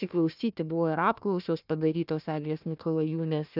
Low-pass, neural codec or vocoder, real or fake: 5.4 kHz; codec, 16 kHz, 1 kbps, FunCodec, trained on Chinese and English, 50 frames a second; fake